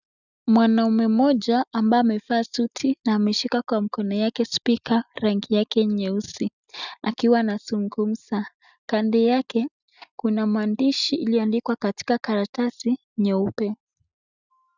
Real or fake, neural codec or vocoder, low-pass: real; none; 7.2 kHz